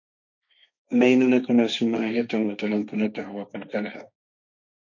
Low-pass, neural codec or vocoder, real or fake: 7.2 kHz; codec, 16 kHz, 1.1 kbps, Voila-Tokenizer; fake